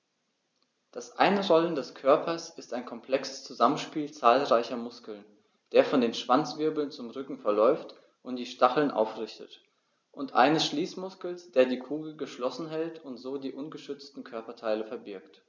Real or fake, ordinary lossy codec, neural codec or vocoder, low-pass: real; none; none; none